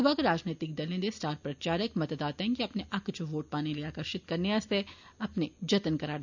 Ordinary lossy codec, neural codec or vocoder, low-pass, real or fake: none; none; 7.2 kHz; real